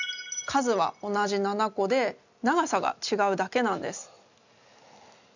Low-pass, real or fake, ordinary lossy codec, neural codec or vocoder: 7.2 kHz; real; none; none